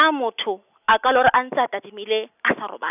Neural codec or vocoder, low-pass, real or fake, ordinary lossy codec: none; 3.6 kHz; real; none